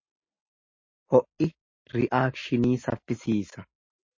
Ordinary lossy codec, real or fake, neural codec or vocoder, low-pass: MP3, 32 kbps; real; none; 7.2 kHz